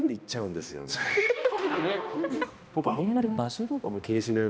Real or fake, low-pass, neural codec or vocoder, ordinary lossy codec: fake; none; codec, 16 kHz, 1 kbps, X-Codec, HuBERT features, trained on balanced general audio; none